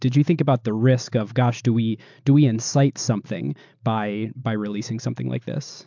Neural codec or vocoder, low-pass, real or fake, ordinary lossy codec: none; 7.2 kHz; real; MP3, 64 kbps